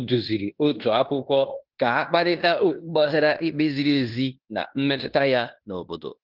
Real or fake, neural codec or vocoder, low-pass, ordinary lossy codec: fake; codec, 16 kHz in and 24 kHz out, 0.9 kbps, LongCat-Audio-Codec, fine tuned four codebook decoder; 5.4 kHz; Opus, 32 kbps